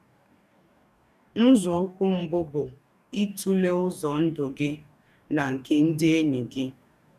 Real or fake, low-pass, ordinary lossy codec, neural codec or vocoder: fake; 14.4 kHz; none; codec, 44.1 kHz, 2.6 kbps, DAC